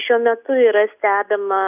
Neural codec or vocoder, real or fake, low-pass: none; real; 3.6 kHz